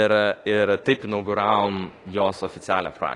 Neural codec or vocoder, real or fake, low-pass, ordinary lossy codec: autoencoder, 48 kHz, 32 numbers a frame, DAC-VAE, trained on Japanese speech; fake; 10.8 kHz; AAC, 32 kbps